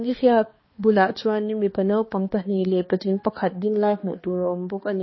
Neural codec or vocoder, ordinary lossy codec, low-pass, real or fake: codec, 16 kHz, 2 kbps, X-Codec, HuBERT features, trained on balanced general audio; MP3, 24 kbps; 7.2 kHz; fake